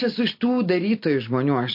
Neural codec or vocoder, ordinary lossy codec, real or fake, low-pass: none; MP3, 32 kbps; real; 5.4 kHz